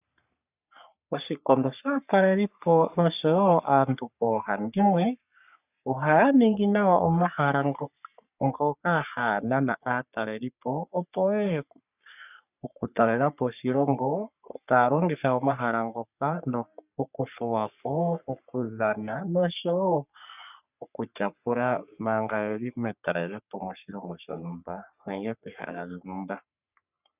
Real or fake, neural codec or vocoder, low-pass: fake; codec, 44.1 kHz, 3.4 kbps, Pupu-Codec; 3.6 kHz